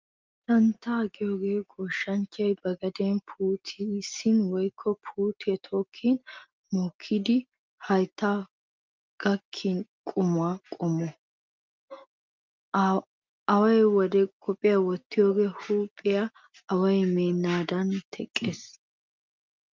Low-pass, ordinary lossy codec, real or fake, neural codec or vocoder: 7.2 kHz; Opus, 32 kbps; real; none